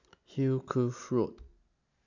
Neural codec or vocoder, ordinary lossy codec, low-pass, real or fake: none; none; 7.2 kHz; real